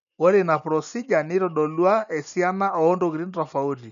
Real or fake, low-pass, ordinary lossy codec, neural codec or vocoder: real; 7.2 kHz; none; none